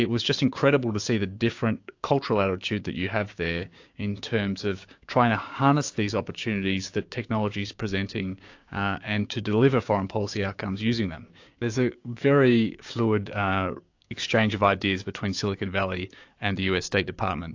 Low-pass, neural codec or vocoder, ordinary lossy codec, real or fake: 7.2 kHz; codec, 16 kHz, 6 kbps, DAC; AAC, 48 kbps; fake